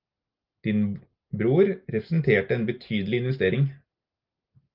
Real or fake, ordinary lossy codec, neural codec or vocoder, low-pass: real; Opus, 24 kbps; none; 5.4 kHz